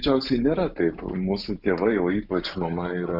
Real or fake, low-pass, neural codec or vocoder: real; 5.4 kHz; none